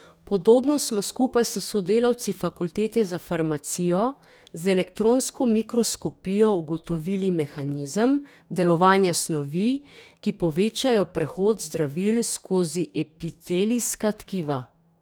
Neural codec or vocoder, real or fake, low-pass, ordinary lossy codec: codec, 44.1 kHz, 2.6 kbps, DAC; fake; none; none